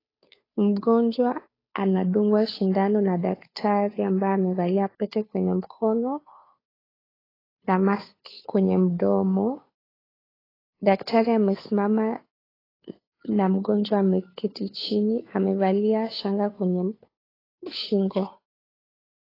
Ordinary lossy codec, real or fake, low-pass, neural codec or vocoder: AAC, 24 kbps; fake; 5.4 kHz; codec, 16 kHz, 2 kbps, FunCodec, trained on Chinese and English, 25 frames a second